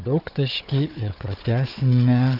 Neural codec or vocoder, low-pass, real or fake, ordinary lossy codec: codec, 16 kHz, 4 kbps, FunCodec, trained on Chinese and English, 50 frames a second; 5.4 kHz; fake; Opus, 64 kbps